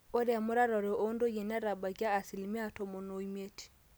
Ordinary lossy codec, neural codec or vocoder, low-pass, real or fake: none; none; none; real